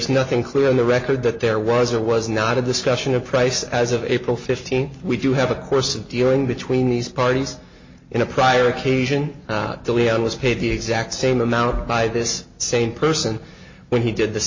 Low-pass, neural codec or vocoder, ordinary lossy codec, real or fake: 7.2 kHz; none; MP3, 32 kbps; real